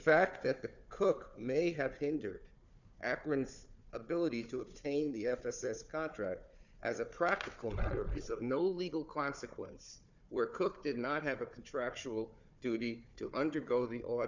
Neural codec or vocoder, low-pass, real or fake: codec, 16 kHz, 4 kbps, FunCodec, trained on Chinese and English, 50 frames a second; 7.2 kHz; fake